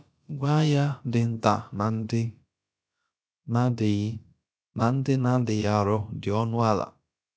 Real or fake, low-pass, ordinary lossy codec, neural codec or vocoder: fake; none; none; codec, 16 kHz, about 1 kbps, DyCAST, with the encoder's durations